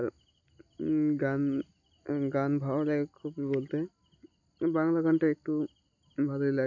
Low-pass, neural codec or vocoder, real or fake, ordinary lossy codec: 7.2 kHz; none; real; none